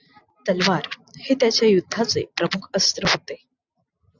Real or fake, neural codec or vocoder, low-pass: real; none; 7.2 kHz